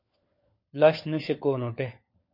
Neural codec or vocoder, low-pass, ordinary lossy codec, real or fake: codec, 16 kHz, 4 kbps, FunCodec, trained on LibriTTS, 50 frames a second; 5.4 kHz; MP3, 32 kbps; fake